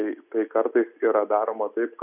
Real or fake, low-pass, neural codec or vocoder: real; 3.6 kHz; none